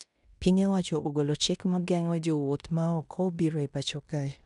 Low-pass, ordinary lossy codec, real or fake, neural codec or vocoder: 10.8 kHz; none; fake; codec, 16 kHz in and 24 kHz out, 0.9 kbps, LongCat-Audio-Codec, fine tuned four codebook decoder